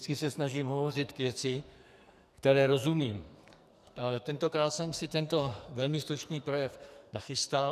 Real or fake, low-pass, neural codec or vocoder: fake; 14.4 kHz; codec, 44.1 kHz, 2.6 kbps, SNAC